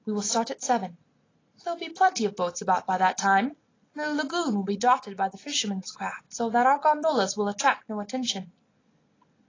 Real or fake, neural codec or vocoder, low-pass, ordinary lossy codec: real; none; 7.2 kHz; AAC, 32 kbps